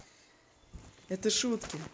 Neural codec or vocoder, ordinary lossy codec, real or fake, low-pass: none; none; real; none